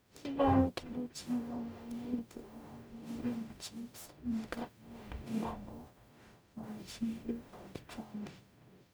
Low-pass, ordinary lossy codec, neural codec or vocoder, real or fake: none; none; codec, 44.1 kHz, 0.9 kbps, DAC; fake